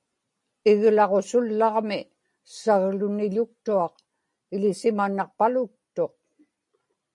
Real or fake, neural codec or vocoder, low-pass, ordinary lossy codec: real; none; 10.8 kHz; MP3, 48 kbps